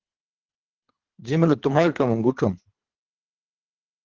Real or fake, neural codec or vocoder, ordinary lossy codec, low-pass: fake; codec, 24 kHz, 3 kbps, HILCodec; Opus, 16 kbps; 7.2 kHz